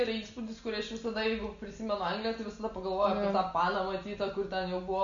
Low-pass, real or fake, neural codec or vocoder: 7.2 kHz; real; none